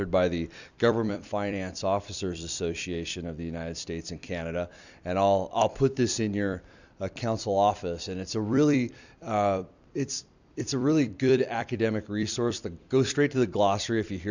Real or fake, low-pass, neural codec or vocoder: fake; 7.2 kHz; vocoder, 44.1 kHz, 128 mel bands every 256 samples, BigVGAN v2